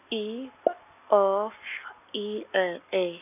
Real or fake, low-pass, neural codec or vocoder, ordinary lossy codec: real; 3.6 kHz; none; none